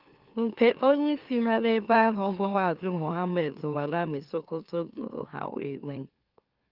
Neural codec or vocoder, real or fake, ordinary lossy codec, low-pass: autoencoder, 44.1 kHz, a latent of 192 numbers a frame, MeloTTS; fake; Opus, 32 kbps; 5.4 kHz